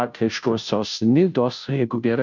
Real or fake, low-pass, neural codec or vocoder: fake; 7.2 kHz; codec, 16 kHz, 0.5 kbps, FunCodec, trained on Chinese and English, 25 frames a second